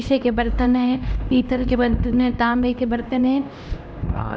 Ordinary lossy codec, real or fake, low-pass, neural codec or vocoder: none; fake; none; codec, 16 kHz, 1 kbps, X-Codec, HuBERT features, trained on LibriSpeech